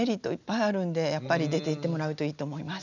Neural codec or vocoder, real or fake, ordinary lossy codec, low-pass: none; real; none; 7.2 kHz